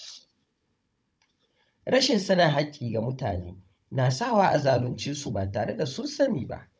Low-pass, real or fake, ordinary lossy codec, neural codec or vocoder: none; fake; none; codec, 16 kHz, 16 kbps, FunCodec, trained on Chinese and English, 50 frames a second